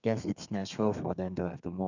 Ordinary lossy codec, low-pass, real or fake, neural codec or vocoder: none; 7.2 kHz; fake; codec, 32 kHz, 1.9 kbps, SNAC